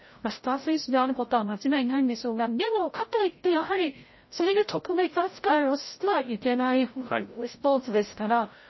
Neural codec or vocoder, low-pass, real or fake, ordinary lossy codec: codec, 16 kHz, 0.5 kbps, FreqCodec, larger model; 7.2 kHz; fake; MP3, 24 kbps